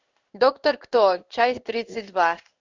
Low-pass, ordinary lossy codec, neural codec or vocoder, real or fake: 7.2 kHz; Opus, 64 kbps; codec, 16 kHz in and 24 kHz out, 1 kbps, XY-Tokenizer; fake